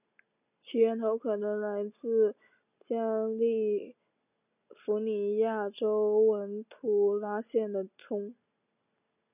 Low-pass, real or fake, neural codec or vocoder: 3.6 kHz; real; none